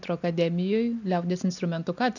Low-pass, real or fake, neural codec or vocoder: 7.2 kHz; real; none